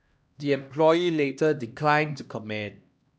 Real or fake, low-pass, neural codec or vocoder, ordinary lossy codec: fake; none; codec, 16 kHz, 1 kbps, X-Codec, HuBERT features, trained on LibriSpeech; none